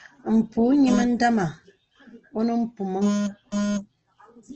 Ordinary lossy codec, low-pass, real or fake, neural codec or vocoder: Opus, 16 kbps; 7.2 kHz; real; none